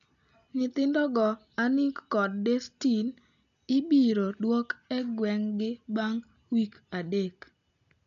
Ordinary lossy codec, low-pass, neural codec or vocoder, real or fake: none; 7.2 kHz; none; real